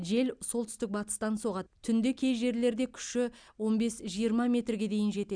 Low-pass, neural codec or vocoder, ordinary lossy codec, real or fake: 9.9 kHz; none; Opus, 64 kbps; real